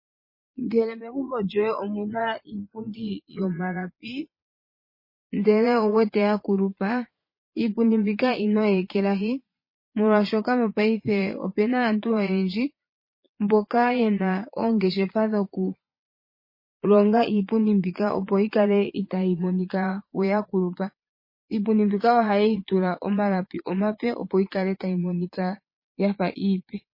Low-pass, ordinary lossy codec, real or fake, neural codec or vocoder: 5.4 kHz; MP3, 24 kbps; fake; vocoder, 22.05 kHz, 80 mel bands, Vocos